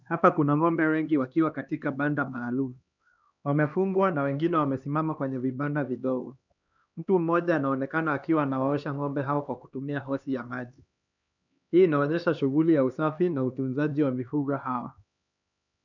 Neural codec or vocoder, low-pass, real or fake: codec, 16 kHz, 2 kbps, X-Codec, HuBERT features, trained on LibriSpeech; 7.2 kHz; fake